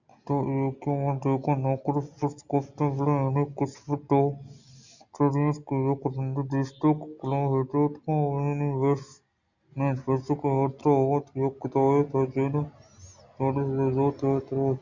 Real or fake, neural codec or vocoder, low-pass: real; none; 7.2 kHz